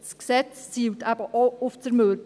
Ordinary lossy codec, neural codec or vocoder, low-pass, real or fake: none; none; none; real